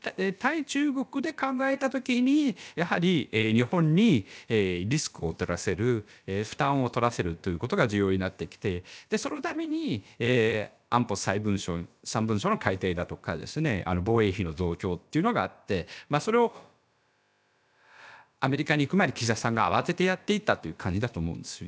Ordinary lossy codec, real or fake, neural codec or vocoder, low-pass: none; fake; codec, 16 kHz, about 1 kbps, DyCAST, with the encoder's durations; none